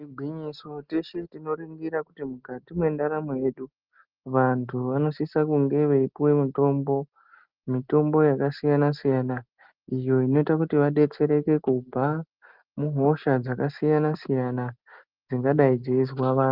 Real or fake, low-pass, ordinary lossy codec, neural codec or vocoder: real; 5.4 kHz; Opus, 24 kbps; none